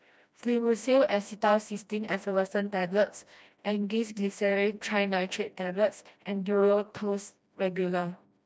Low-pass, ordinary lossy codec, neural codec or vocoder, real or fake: none; none; codec, 16 kHz, 1 kbps, FreqCodec, smaller model; fake